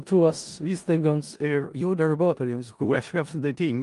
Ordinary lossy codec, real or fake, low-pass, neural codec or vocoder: Opus, 32 kbps; fake; 10.8 kHz; codec, 16 kHz in and 24 kHz out, 0.4 kbps, LongCat-Audio-Codec, four codebook decoder